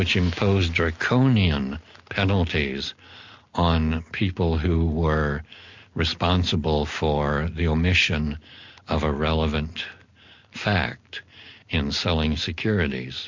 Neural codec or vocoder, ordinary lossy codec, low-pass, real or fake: none; MP3, 48 kbps; 7.2 kHz; real